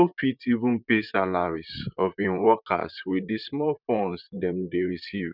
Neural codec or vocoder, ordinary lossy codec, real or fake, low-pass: vocoder, 44.1 kHz, 128 mel bands, Pupu-Vocoder; none; fake; 5.4 kHz